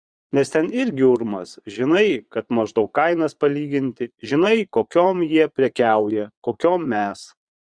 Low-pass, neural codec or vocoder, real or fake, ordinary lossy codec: 9.9 kHz; vocoder, 22.05 kHz, 80 mel bands, Vocos; fake; Opus, 64 kbps